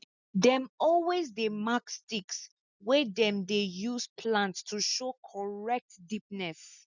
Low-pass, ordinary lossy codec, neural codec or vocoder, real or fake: 7.2 kHz; none; none; real